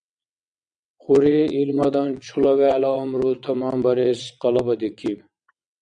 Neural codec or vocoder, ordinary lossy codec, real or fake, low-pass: vocoder, 22.05 kHz, 80 mel bands, WaveNeXt; MP3, 96 kbps; fake; 9.9 kHz